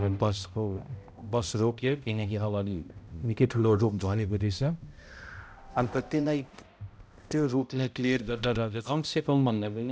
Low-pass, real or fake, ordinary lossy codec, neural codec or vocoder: none; fake; none; codec, 16 kHz, 0.5 kbps, X-Codec, HuBERT features, trained on balanced general audio